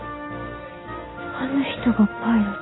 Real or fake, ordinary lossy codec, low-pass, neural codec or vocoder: real; AAC, 16 kbps; 7.2 kHz; none